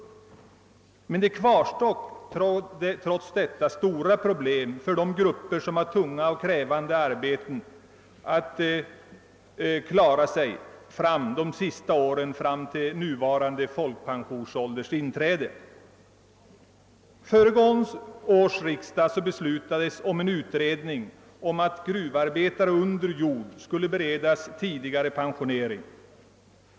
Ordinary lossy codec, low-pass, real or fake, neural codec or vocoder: none; none; real; none